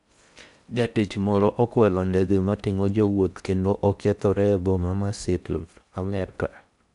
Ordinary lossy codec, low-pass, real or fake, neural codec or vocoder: none; 10.8 kHz; fake; codec, 16 kHz in and 24 kHz out, 0.6 kbps, FocalCodec, streaming, 4096 codes